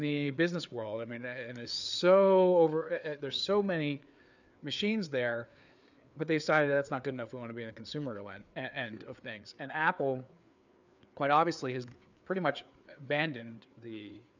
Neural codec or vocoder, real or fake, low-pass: codec, 16 kHz, 4 kbps, FreqCodec, larger model; fake; 7.2 kHz